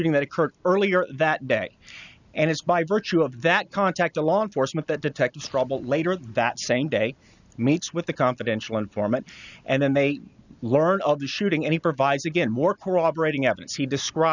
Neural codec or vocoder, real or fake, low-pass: none; real; 7.2 kHz